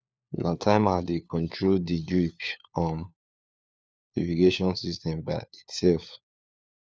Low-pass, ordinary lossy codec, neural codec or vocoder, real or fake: none; none; codec, 16 kHz, 4 kbps, FunCodec, trained on LibriTTS, 50 frames a second; fake